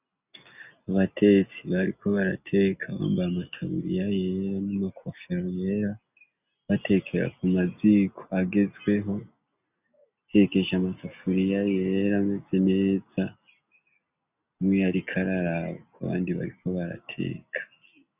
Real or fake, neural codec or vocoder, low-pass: real; none; 3.6 kHz